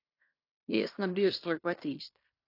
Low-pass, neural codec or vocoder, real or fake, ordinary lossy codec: 5.4 kHz; codec, 16 kHz in and 24 kHz out, 0.9 kbps, LongCat-Audio-Codec, fine tuned four codebook decoder; fake; AAC, 32 kbps